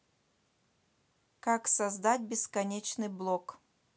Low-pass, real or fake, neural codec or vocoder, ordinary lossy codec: none; real; none; none